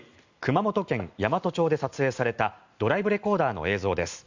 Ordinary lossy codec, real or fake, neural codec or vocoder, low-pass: Opus, 64 kbps; real; none; 7.2 kHz